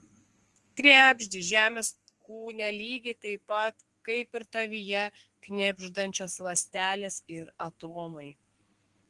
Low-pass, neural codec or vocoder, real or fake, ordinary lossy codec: 10.8 kHz; codec, 32 kHz, 1.9 kbps, SNAC; fake; Opus, 24 kbps